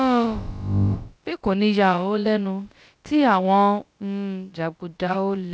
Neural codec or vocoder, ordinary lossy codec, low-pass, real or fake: codec, 16 kHz, about 1 kbps, DyCAST, with the encoder's durations; none; none; fake